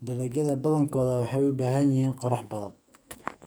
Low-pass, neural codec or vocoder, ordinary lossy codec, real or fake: none; codec, 44.1 kHz, 2.6 kbps, SNAC; none; fake